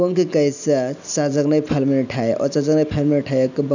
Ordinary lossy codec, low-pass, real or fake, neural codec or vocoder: none; 7.2 kHz; real; none